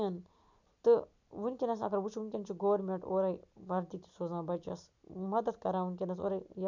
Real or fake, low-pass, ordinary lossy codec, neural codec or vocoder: real; 7.2 kHz; none; none